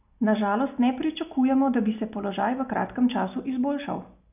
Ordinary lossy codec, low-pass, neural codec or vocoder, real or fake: none; 3.6 kHz; none; real